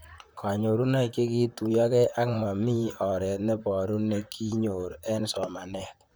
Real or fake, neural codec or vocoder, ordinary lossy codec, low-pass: fake; vocoder, 44.1 kHz, 128 mel bands, Pupu-Vocoder; none; none